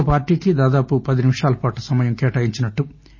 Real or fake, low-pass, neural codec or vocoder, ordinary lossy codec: real; 7.2 kHz; none; MP3, 32 kbps